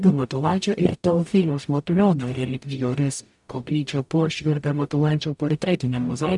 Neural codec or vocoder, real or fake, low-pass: codec, 44.1 kHz, 0.9 kbps, DAC; fake; 10.8 kHz